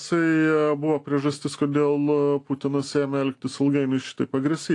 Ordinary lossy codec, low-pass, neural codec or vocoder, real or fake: AAC, 48 kbps; 10.8 kHz; none; real